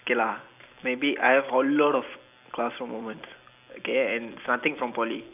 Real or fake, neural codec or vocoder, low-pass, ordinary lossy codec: real; none; 3.6 kHz; AAC, 32 kbps